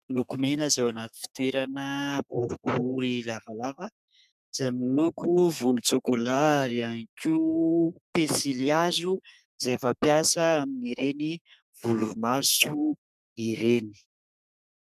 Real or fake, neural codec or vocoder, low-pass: fake; codec, 32 kHz, 1.9 kbps, SNAC; 14.4 kHz